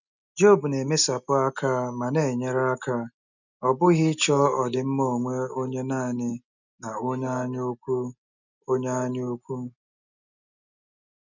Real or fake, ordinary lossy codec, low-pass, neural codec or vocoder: real; none; 7.2 kHz; none